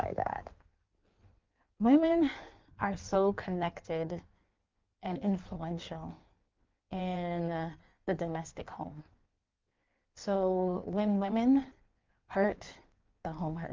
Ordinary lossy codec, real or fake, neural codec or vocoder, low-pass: Opus, 32 kbps; fake; codec, 16 kHz in and 24 kHz out, 1.1 kbps, FireRedTTS-2 codec; 7.2 kHz